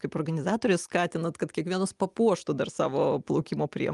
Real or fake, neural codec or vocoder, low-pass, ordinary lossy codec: real; none; 10.8 kHz; Opus, 32 kbps